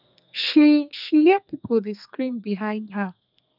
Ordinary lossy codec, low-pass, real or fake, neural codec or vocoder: none; 5.4 kHz; fake; codec, 32 kHz, 1.9 kbps, SNAC